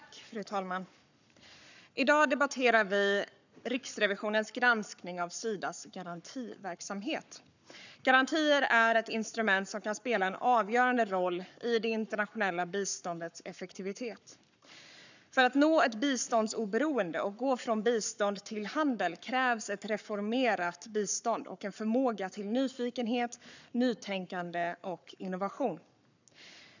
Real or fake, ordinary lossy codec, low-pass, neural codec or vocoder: fake; none; 7.2 kHz; codec, 44.1 kHz, 7.8 kbps, Pupu-Codec